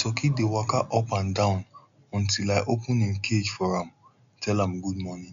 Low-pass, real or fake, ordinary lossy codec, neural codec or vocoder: 7.2 kHz; real; MP3, 64 kbps; none